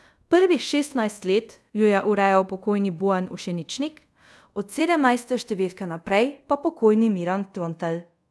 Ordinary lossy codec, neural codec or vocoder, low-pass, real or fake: none; codec, 24 kHz, 0.5 kbps, DualCodec; none; fake